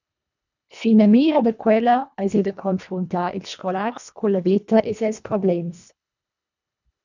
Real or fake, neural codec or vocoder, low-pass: fake; codec, 24 kHz, 1.5 kbps, HILCodec; 7.2 kHz